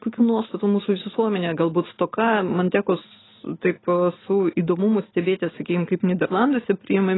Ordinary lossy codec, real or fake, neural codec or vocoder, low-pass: AAC, 16 kbps; real; none; 7.2 kHz